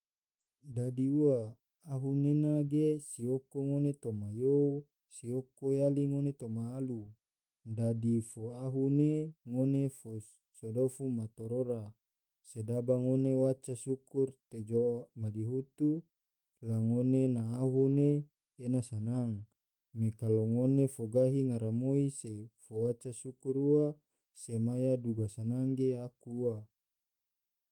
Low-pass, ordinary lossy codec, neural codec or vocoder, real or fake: 19.8 kHz; Opus, 24 kbps; autoencoder, 48 kHz, 128 numbers a frame, DAC-VAE, trained on Japanese speech; fake